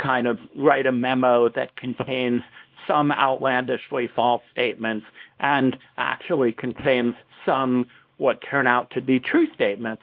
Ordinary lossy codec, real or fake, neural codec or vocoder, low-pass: Opus, 24 kbps; fake; codec, 24 kHz, 0.9 kbps, WavTokenizer, small release; 5.4 kHz